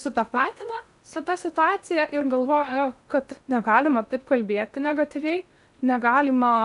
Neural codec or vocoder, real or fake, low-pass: codec, 16 kHz in and 24 kHz out, 0.8 kbps, FocalCodec, streaming, 65536 codes; fake; 10.8 kHz